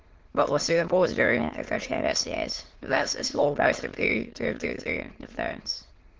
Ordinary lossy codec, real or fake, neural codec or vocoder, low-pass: Opus, 16 kbps; fake; autoencoder, 22.05 kHz, a latent of 192 numbers a frame, VITS, trained on many speakers; 7.2 kHz